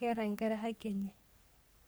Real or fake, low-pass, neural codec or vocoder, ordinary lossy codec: fake; none; codec, 44.1 kHz, 3.4 kbps, Pupu-Codec; none